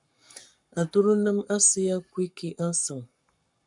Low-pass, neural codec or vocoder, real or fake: 10.8 kHz; codec, 44.1 kHz, 7.8 kbps, Pupu-Codec; fake